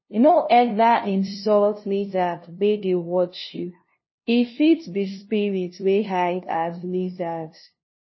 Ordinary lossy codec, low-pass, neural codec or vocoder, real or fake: MP3, 24 kbps; 7.2 kHz; codec, 16 kHz, 0.5 kbps, FunCodec, trained on LibriTTS, 25 frames a second; fake